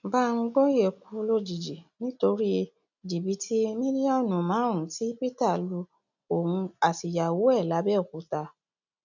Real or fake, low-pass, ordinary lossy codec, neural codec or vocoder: real; 7.2 kHz; none; none